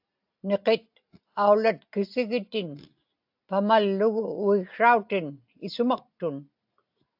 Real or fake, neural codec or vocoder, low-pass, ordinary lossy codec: real; none; 5.4 kHz; AAC, 48 kbps